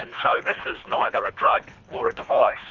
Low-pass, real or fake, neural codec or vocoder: 7.2 kHz; fake; codec, 24 kHz, 1.5 kbps, HILCodec